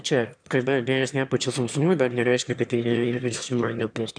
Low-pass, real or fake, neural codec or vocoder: 9.9 kHz; fake; autoencoder, 22.05 kHz, a latent of 192 numbers a frame, VITS, trained on one speaker